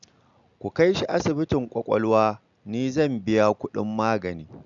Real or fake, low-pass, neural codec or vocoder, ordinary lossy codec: real; 7.2 kHz; none; none